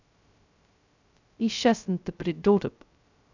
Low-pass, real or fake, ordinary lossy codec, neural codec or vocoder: 7.2 kHz; fake; none; codec, 16 kHz, 0.2 kbps, FocalCodec